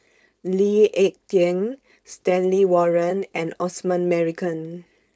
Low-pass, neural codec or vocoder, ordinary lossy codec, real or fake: none; codec, 16 kHz, 4.8 kbps, FACodec; none; fake